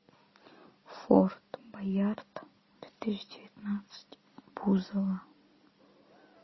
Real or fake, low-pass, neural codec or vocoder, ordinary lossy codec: real; 7.2 kHz; none; MP3, 24 kbps